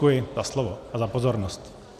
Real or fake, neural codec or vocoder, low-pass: real; none; 14.4 kHz